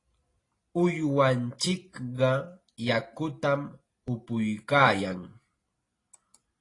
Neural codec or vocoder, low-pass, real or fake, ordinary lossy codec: none; 10.8 kHz; real; AAC, 32 kbps